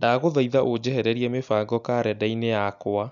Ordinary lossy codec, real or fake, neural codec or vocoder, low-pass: none; real; none; 7.2 kHz